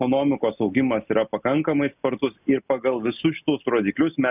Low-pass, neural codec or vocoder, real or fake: 3.6 kHz; none; real